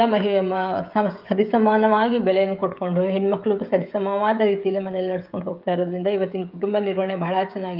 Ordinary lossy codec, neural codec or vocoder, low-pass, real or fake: Opus, 24 kbps; codec, 16 kHz, 16 kbps, FreqCodec, smaller model; 5.4 kHz; fake